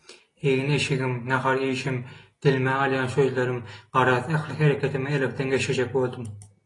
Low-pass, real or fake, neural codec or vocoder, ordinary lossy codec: 10.8 kHz; real; none; AAC, 32 kbps